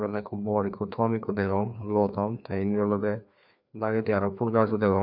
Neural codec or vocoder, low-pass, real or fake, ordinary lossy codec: codec, 16 kHz, 2 kbps, FreqCodec, larger model; 5.4 kHz; fake; none